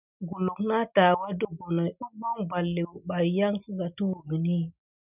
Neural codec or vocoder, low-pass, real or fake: none; 3.6 kHz; real